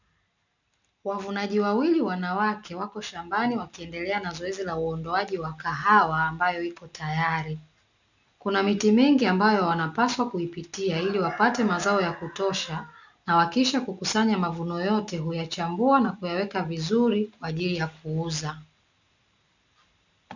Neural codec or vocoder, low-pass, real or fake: none; 7.2 kHz; real